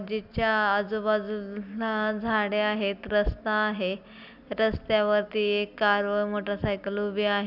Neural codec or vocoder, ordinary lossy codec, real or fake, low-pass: none; none; real; 5.4 kHz